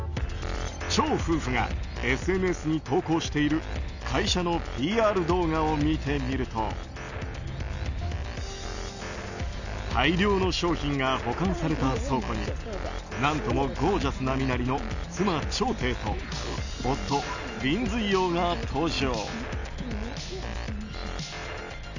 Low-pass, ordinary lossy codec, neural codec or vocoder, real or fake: 7.2 kHz; none; none; real